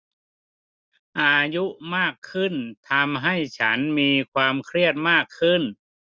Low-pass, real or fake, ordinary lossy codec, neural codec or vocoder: none; real; none; none